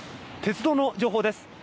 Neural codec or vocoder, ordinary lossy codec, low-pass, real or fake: none; none; none; real